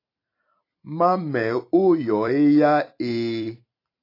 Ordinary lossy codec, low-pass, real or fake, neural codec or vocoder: AAC, 32 kbps; 5.4 kHz; fake; vocoder, 44.1 kHz, 128 mel bands every 256 samples, BigVGAN v2